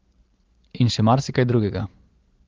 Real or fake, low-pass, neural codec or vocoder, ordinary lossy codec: real; 7.2 kHz; none; Opus, 16 kbps